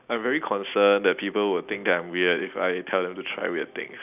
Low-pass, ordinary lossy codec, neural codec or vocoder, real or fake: 3.6 kHz; none; none; real